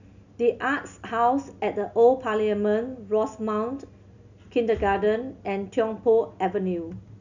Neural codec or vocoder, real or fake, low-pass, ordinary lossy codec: none; real; 7.2 kHz; none